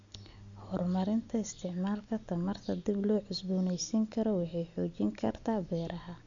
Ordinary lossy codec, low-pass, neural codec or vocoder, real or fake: MP3, 64 kbps; 7.2 kHz; none; real